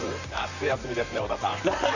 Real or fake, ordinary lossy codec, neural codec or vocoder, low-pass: fake; none; vocoder, 44.1 kHz, 128 mel bands, Pupu-Vocoder; 7.2 kHz